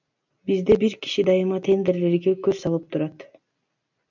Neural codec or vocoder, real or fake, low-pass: none; real; 7.2 kHz